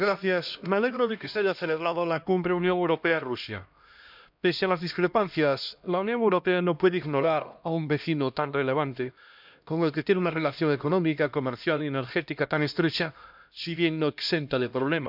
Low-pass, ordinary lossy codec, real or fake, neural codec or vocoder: 5.4 kHz; none; fake; codec, 16 kHz, 1 kbps, X-Codec, HuBERT features, trained on LibriSpeech